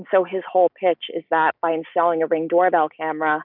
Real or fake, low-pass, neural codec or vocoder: real; 5.4 kHz; none